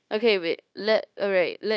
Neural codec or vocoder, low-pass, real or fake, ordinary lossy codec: codec, 16 kHz, 0.9 kbps, LongCat-Audio-Codec; none; fake; none